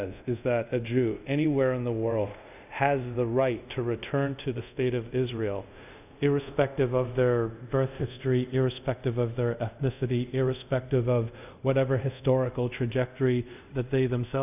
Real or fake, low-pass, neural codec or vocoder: fake; 3.6 kHz; codec, 24 kHz, 0.5 kbps, DualCodec